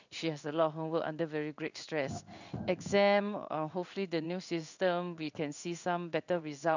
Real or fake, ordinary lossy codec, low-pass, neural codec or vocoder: fake; none; 7.2 kHz; codec, 16 kHz in and 24 kHz out, 1 kbps, XY-Tokenizer